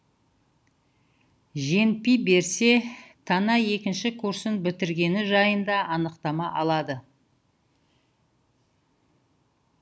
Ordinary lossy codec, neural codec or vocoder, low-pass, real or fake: none; none; none; real